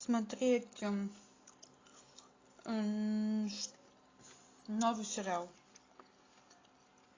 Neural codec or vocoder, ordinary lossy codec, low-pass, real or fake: none; AAC, 32 kbps; 7.2 kHz; real